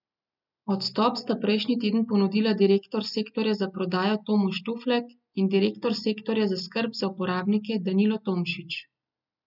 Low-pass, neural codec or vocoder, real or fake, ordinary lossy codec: 5.4 kHz; none; real; none